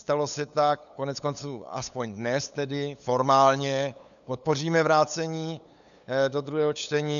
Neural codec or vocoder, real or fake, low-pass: codec, 16 kHz, 8 kbps, FunCodec, trained on LibriTTS, 25 frames a second; fake; 7.2 kHz